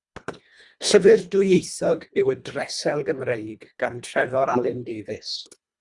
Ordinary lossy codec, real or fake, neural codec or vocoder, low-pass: Opus, 64 kbps; fake; codec, 24 kHz, 1.5 kbps, HILCodec; 10.8 kHz